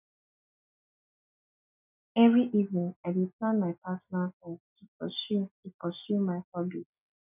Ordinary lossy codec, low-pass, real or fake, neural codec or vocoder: none; 3.6 kHz; real; none